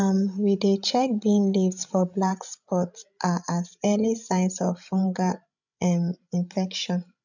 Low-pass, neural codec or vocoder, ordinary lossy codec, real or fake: 7.2 kHz; codec, 16 kHz, 16 kbps, FreqCodec, larger model; none; fake